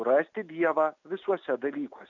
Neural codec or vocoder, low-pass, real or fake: none; 7.2 kHz; real